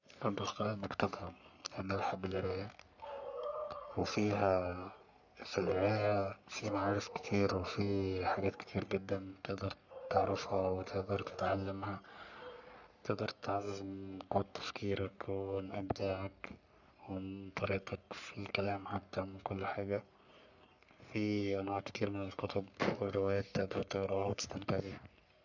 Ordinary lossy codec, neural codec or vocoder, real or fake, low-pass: none; codec, 44.1 kHz, 3.4 kbps, Pupu-Codec; fake; 7.2 kHz